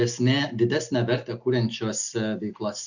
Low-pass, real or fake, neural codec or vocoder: 7.2 kHz; real; none